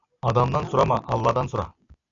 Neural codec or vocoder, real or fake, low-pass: none; real; 7.2 kHz